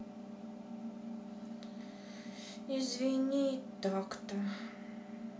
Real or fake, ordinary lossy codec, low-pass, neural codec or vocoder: real; none; none; none